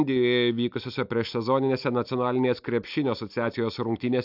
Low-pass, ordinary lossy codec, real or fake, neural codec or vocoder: 5.4 kHz; AAC, 48 kbps; real; none